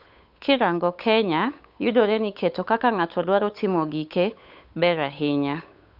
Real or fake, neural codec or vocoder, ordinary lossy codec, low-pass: fake; codec, 16 kHz, 8 kbps, FunCodec, trained on Chinese and English, 25 frames a second; none; 5.4 kHz